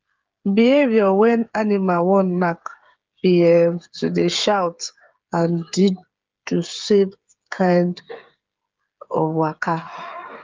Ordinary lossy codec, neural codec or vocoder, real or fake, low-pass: Opus, 32 kbps; codec, 16 kHz, 8 kbps, FreqCodec, smaller model; fake; 7.2 kHz